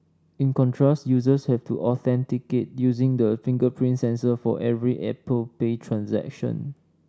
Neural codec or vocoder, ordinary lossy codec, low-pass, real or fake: none; none; none; real